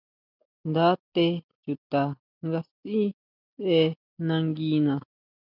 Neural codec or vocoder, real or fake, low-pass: none; real; 5.4 kHz